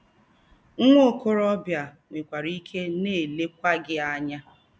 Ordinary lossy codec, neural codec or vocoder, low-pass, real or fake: none; none; none; real